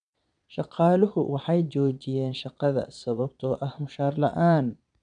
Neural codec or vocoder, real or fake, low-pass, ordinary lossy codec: vocoder, 22.05 kHz, 80 mel bands, Vocos; fake; 9.9 kHz; none